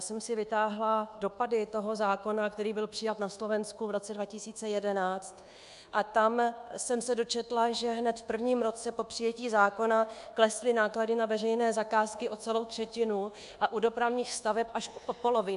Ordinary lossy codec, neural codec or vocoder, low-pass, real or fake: AAC, 96 kbps; codec, 24 kHz, 1.2 kbps, DualCodec; 10.8 kHz; fake